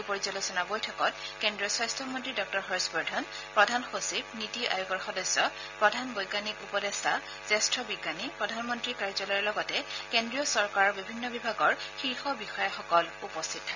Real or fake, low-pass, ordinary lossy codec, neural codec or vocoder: real; 7.2 kHz; none; none